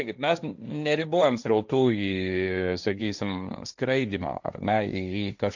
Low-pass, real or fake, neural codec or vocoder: 7.2 kHz; fake; codec, 16 kHz, 1.1 kbps, Voila-Tokenizer